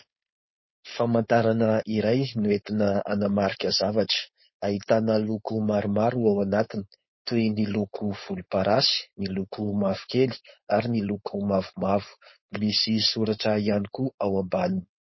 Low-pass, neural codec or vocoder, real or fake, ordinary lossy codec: 7.2 kHz; codec, 16 kHz, 4.8 kbps, FACodec; fake; MP3, 24 kbps